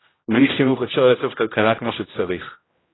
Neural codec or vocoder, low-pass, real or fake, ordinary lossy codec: codec, 16 kHz, 0.5 kbps, X-Codec, HuBERT features, trained on general audio; 7.2 kHz; fake; AAC, 16 kbps